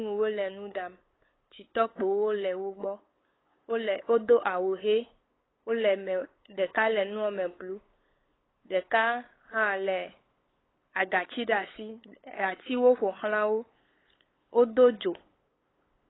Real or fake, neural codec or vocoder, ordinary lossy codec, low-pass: fake; codec, 16 kHz, 8 kbps, FunCodec, trained on LibriTTS, 25 frames a second; AAC, 16 kbps; 7.2 kHz